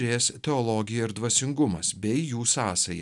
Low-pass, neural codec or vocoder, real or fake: 10.8 kHz; none; real